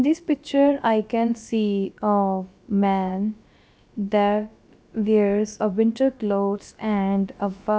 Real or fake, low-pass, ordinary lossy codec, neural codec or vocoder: fake; none; none; codec, 16 kHz, about 1 kbps, DyCAST, with the encoder's durations